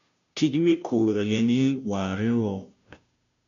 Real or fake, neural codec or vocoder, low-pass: fake; codec, 16 kHz, 0.5 kbps, FunCodec, trained on Chinese and English, 25 frames a second; 7.2 kHz